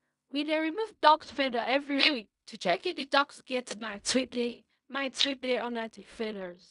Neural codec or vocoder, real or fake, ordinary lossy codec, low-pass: codec, 16 kHz in and 24 kHz out, 0.4 kbps, LongCat-Audio-Codec, fine tuned four codebook decoder; fake; none; 10.8 kHz